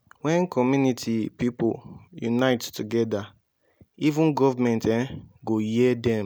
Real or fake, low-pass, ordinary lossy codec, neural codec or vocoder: real; none; none; none